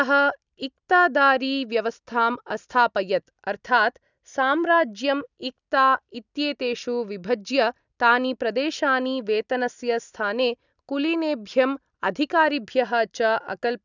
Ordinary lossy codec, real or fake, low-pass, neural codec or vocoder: none; real; 7.2 kHz; none